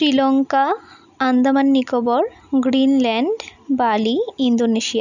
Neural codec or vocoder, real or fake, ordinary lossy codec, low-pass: none; real; none; 7.2 kHz